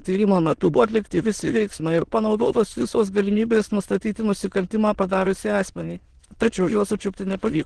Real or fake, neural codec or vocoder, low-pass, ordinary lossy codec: fake; autoencoder, 22.05 kHz, a latent of 192 numbers a frame, VITS, trained on many speakers; 9.9 kHz; Opus, 16 kbps